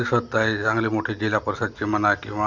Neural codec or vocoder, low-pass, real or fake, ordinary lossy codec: none; 7.2 kHz; real; none